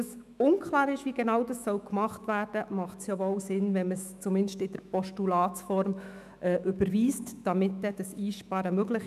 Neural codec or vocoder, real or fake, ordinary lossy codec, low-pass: autoencoder, 48 kHz, 128 numbers a frame, DAC-VAE, trained on Japanese speech; fake; none; 14.4 kHz